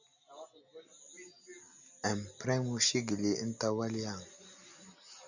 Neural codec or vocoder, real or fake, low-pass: none; real; 7.2 kHz